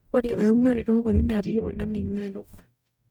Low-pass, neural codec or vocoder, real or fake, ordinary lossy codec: 19.8 kHz; codec, 44.1 kHz, 0.9 kbps, DAC; fake; none